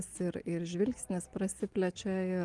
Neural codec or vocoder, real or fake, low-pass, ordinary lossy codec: none; real; 10.8 kHz; Opus, 24 kbps